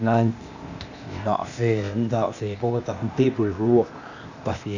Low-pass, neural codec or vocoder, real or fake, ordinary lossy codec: 7.2 kHz; codec, 16 kHz, 0.8 kbps, ZipCodec; fake; Opus, 64 kbps